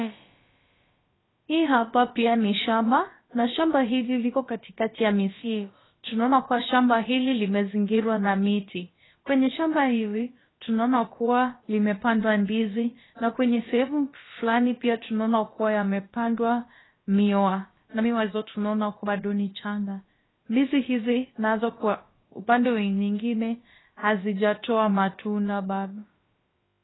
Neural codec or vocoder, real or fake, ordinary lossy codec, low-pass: codec, 16 kHz, about 1 kbps, DyCAST, with the encoder's durations; fake; AAC, 16 kbps; 7.2 kHz